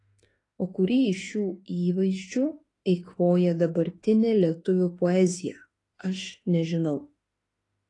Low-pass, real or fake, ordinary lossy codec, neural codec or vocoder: 10.8 kHz; fake; AAC, 32 kbps; autoencoder, 48 kHz, 32 numbers a frame, DAC-VAE, trained on Japanese speech